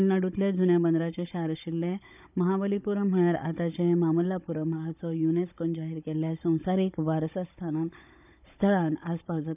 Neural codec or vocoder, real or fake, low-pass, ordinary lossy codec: codec, 16 kHz, 16 kbps, FunCodec, trained on Chinese and English, 50 frames a second; fake; 3.6 kHz; none